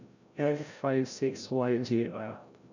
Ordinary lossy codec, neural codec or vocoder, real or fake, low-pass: none; codec, 16 kHz, 0.5 kbps, FreqCodec, larger model; fake; 7.2 kHz